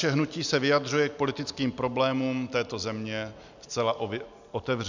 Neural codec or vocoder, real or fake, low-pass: none; real; 7.2 kHz